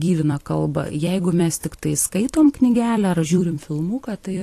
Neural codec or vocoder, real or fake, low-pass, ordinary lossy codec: vocoder, 44.1 kHz, 128 mel bands every 256 samples, BigVGAN v2; fake; 14.4 kHz; AAC, 48 kbps